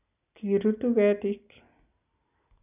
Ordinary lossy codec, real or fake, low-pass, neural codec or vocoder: none; real; 3.6 kHz; none